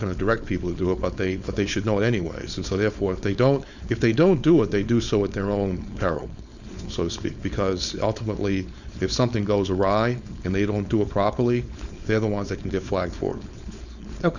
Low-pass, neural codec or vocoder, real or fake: 7.2 kHz; codec, 16 kHz, 4.8 kbps, FACodec; fake